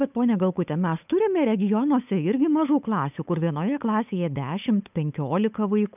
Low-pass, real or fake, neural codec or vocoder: 3.6 kHz; fake; codec, 16 kHz, 8 kbps, FunCodec, trained on Chinese and English, 25 frames a second